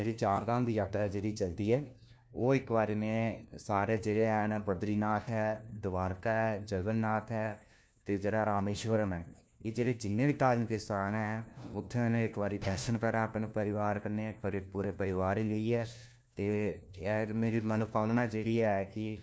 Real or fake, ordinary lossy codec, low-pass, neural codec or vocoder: fake; none; none; codec, 16 kHz, 1 kbps, FunCodec, trained on LibriTTS, 50 frames a second